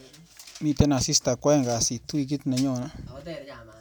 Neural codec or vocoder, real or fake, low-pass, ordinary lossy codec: none; real; none; none